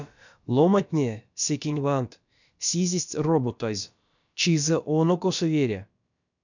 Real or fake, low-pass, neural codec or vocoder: fake; 7.2 kHz; codec, 16 kHz, about 1 kbps, DyCAST, with the encoder's durations